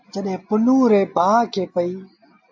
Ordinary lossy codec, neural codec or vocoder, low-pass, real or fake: AAC, 48 kbps; none; 7.2 kHz; real